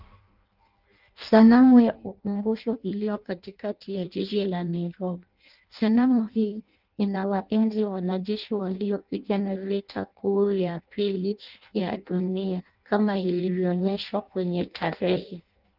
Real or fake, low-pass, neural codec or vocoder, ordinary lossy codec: fake; 5.4 kHz; codec, 16 kHz in and 24 kHz out, 0.6 kbps, FireRedTTS-2 codec; Opus, 32 kbps